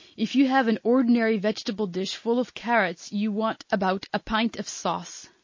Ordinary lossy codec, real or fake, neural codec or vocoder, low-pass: MP3, 32 kbps; real; none; 7.2 kHz